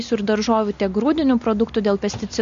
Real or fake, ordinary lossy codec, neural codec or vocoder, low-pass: real; MP3, 48 kbps; none; 7.2 kHz